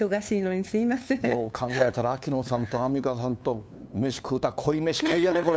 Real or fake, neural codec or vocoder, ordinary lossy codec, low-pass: fake; codec, 16 kHz, 2 kbps, FunCodec, trained on LibriTTS, 25 frames a second; none; none